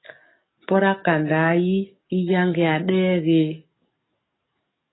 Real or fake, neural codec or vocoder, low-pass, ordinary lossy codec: fake; codec, 44.1 kHz, 7.8 kbps, DAC; 7.2 kHz; AAC, 16 kbps